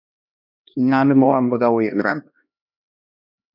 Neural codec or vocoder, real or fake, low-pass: codec, 16 kHz, 1 kbps, X-Codec, HuBERT features, trained on LibriSpeech; fake; 5.4 kHz